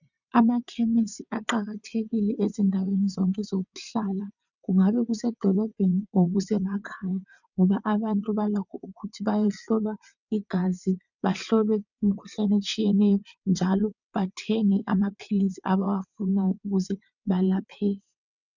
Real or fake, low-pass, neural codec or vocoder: fake; 7.2 kHz; vocoder, 22.05 kHz, 80 mel bands, WaveNeXt